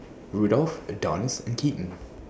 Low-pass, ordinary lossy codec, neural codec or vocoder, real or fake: none; none; none; real